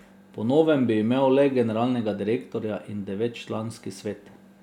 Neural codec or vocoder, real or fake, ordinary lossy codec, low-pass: none; real; none; 19.8 kHz